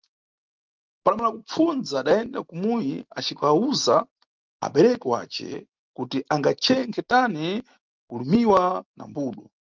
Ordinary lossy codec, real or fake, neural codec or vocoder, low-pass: Opus, 32 kbps; real; none; 7.2 kHz